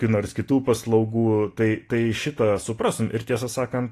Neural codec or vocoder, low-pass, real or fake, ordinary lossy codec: none; 14.4 kHz; real; AAC, 48 kbps